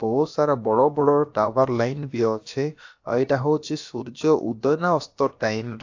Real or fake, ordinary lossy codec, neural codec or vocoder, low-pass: fake; AAC, 48 kbps; codec, 16 kHz, about 1 kbps, DyCAST, with the encoder's durations; 7.2 kHz